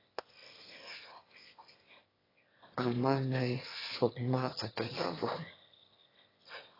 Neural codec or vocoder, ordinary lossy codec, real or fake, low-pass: autoencoder, 22.05 kHz, a latent of 192 numbers a frame, VITS, trained on one speaker; AAC, 24 kbps; fake; 5.4 kHz